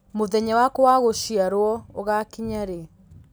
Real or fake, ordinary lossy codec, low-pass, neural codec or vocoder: real; none; none; none